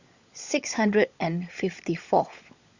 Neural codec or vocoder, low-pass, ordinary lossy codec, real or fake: codec, 16 kHz, 16 kbps, FunCodec, trained on LibriTTS, 50 frames a second; 7.2 kHz; Opus, 64 kbps; fake